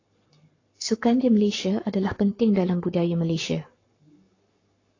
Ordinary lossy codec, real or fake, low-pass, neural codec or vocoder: AAC, 32 kbps; fake; 7.2 kHz; codec, 44.1 kHz, 7.8 kbps, Pupu-Codec